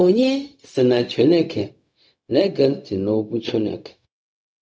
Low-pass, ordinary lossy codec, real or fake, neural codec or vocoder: none; none; fake; codec, 16 kHz, 0.4 kbps, LongCat-Audio-Codec